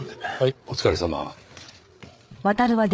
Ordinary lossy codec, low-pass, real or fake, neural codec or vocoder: none; none; fake; codec, 16 kHz, 8 kbps, FreqCodec, larger model